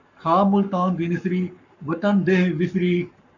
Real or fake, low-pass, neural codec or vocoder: fake; 7.2 kHz; codec, 44.1 kHz, 7.8 kbps, Pupu-Codec